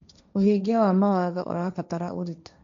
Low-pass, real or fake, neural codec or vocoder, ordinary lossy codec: 7.2 kHz; fake; codec, 16 kHz, 1.1 kbps, Voila-Tokenizer; MP3, 64 kbps